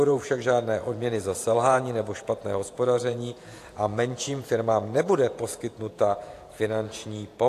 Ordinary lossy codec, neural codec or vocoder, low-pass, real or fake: AAC, 64 kbps; vocoder, 48 kHz, 128 mel bands, Vocos; 14.4 kHz; fake